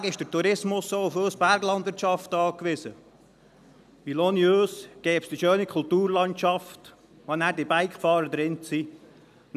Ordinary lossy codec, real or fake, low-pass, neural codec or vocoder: none; real; 14.4 kHz; none